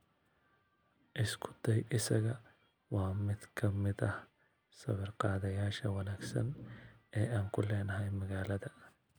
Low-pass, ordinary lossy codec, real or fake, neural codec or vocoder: none; none; real; none